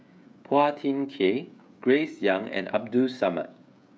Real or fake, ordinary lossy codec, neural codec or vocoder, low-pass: fake; none; codec, 16 kHz, 16 kbps, FreqCodec, smaller model; none